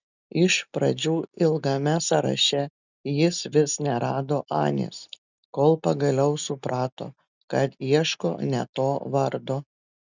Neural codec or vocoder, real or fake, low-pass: none; real; 7.2 kHz